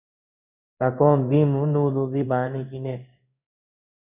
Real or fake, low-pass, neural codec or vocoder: fake; 3.6 kHz; codec, 16 kHz in and 24 kHz out, 1 kbps, XY-Tokenizer